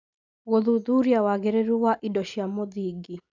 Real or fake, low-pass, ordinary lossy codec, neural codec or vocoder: real; 7.2 kHz; none; none